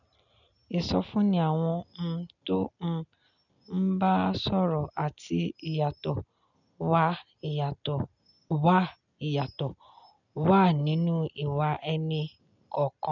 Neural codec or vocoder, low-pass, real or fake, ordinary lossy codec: none; 7.2 kHz; real; none